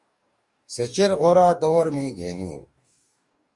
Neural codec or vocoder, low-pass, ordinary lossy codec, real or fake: codec, 44.1 kHz, 2.6 kbps, DAC; 10.8 kHz; Opus, 64 kbps; fake